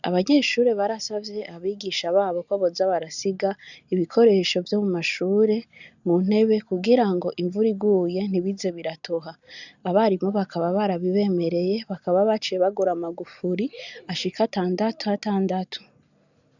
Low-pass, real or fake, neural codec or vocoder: 7.2 kHz; real; none